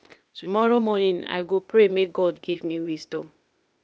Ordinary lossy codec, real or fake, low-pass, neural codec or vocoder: none; fake; none; codec, 16 kHz, 0.8 kbps, ZipCodec